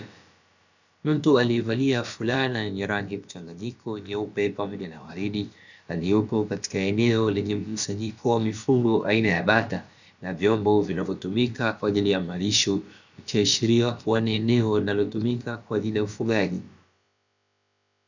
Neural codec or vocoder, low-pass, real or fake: codec, 16 kHz, about 1 kbps, DyCAST, with the encoder's durations; 7.2 kHz; fake